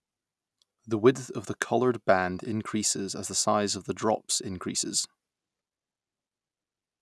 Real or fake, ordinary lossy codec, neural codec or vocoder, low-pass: real; none; none; none